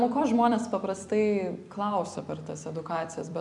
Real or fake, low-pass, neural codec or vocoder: fake; 10.8 kHz; vocoder, 44.1 kHz, 128 mel bands every 256 samples, BigVGAN v2